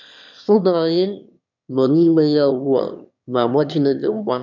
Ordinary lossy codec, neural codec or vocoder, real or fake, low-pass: none; autoencoder, 22.05 kHz, a latent of 192 numbers a frame, VITS, trained on one speaker; fake; 7.2 kHz